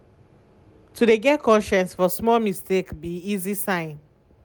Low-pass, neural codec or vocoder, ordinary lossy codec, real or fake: none; none; none; real